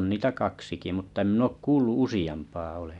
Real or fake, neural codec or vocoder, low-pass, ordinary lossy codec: real; none; 10.8 kHz; none